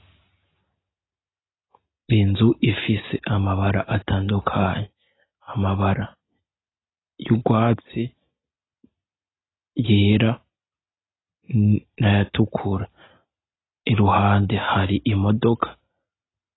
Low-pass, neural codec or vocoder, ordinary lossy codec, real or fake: 7.2 kHz; none; AAC, 16 kbps; real